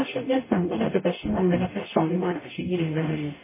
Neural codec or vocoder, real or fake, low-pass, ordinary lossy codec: codec, 44.1 kHz, 0.9 kbps, DAC; fake; 3.6 kHz; MP3, 16 kbps